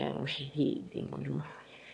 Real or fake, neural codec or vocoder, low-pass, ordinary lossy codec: fake; autoencoder, 22.05 kHz, a latent of 192 numbers a frame, VITS, trained on one speaker; none; none